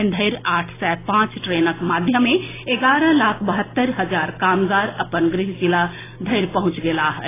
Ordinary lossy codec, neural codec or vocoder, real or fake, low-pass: AAC, 16 kbps; none; real; 3.6 kHz